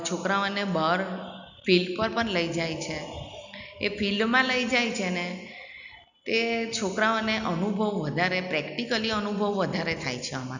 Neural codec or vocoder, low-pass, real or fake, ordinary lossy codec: none; 7.2 kHz; real; none